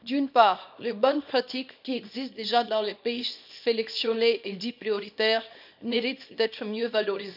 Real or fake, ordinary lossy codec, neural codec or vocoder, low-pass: fake; none; codec, 24 kHz, 0.9 kbps, WavTokenizer, small release; 5.4 kHz